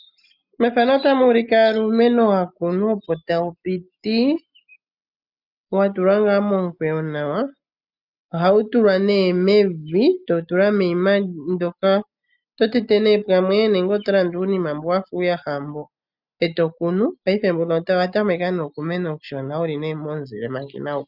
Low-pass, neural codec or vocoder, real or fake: 5.4 kHz; none; real